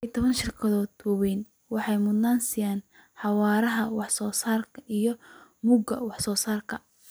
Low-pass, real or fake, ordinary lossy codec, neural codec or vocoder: none; fake; none; vocoder, 44.1 kHz, 128 mel bands every 256 samples, BigVGAN v2